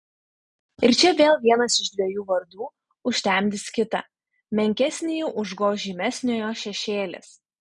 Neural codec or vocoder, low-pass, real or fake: none; 10.8 kHz; real